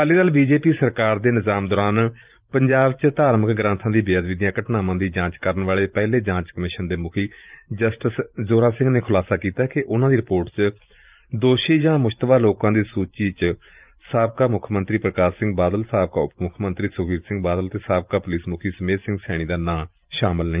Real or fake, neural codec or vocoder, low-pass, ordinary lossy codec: fake; vocoder, 44.1 kHz, 128 mel bands every 512 samples, BigVGAN v2; 3.6 kHz; Opus, 24 kbps